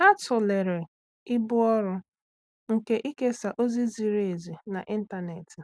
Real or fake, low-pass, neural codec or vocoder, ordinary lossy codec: real; none; none; none